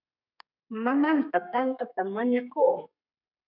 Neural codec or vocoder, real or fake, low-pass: codec, 32 kHz, 1.9 kbps, SNAC; fake; 5.4 kHz